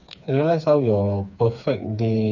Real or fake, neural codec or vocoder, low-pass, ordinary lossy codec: fake; codec, 16 kHz, 4 kbps, FreqCodec, smaller model; 7.2 kHz; none